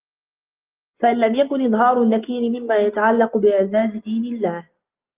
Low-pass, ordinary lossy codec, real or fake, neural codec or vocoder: 3.6 kHz; Opus, 32 kbps; real; none